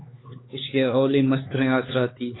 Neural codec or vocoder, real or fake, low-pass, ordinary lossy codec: codec, 16 kHz, 4 kbps, X-Codec, HuBERT features, trained on LibriSpeech; fake; 7.2 kHz; AAC, 16 kbps